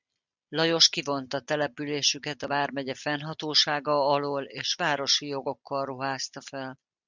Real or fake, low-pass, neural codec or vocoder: real; 7.2 kHz; none